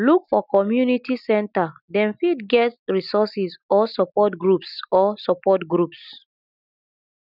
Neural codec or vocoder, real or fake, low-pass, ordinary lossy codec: none; real; 5.4 kHz; none